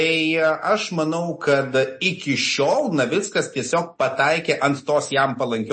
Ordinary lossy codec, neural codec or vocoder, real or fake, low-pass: MP3, 32 kbps; none; real; 9.9 kHz